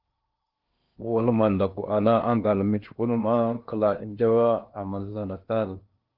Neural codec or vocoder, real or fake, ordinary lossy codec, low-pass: codec, 16 kHz in and 24 kHz out, 0.8 kbps, FocalCodec, streaming, 65536 codes; fake; Opus, 32 kbps; 5.4 kHz